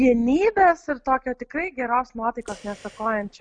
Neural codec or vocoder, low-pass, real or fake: none; 9.9 kHz; real